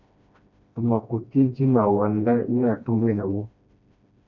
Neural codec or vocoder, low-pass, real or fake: codec, 16 kHz, 1 kbps, FreqCodec, smaller model; 7.2 kHz; fake